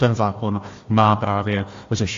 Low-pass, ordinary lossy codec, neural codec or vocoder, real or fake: 7.2 kHz; AAC, 48 kbps; codec, 16 kHz, 1 kbps, FunCodec, trained on Chinese and English, 50 frames a second; fake